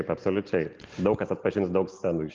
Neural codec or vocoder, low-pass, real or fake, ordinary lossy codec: none; 7.2 kHz; real; Opus, 32 kbps